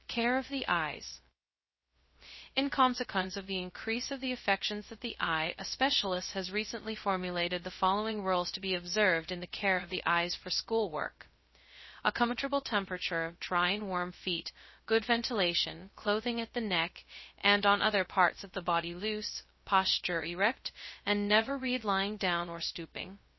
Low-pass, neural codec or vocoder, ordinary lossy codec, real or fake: 7.2 kHz; codec, 16 kHz, 0.2 kbps, FocalCodec; MP3, 24 kbps; fake